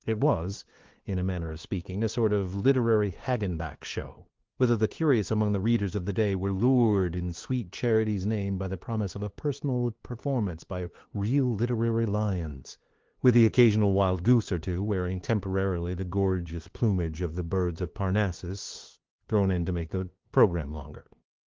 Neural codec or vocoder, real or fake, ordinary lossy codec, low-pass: codec, 16 kHz, 2 kbps, FunCodec, trained on LibriTTS, 25 frames a second; fake; Opus, 16 kbps; 7.2 kHz